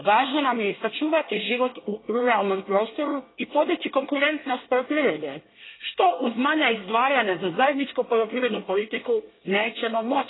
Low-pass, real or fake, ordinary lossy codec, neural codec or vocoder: 7.2 kHz; fake; AAC, 16 kbps; codec, 24 kHz, 1 kbps, SNAC